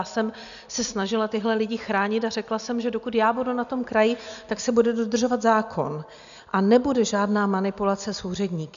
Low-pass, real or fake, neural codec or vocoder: 7.2 kHz; real; none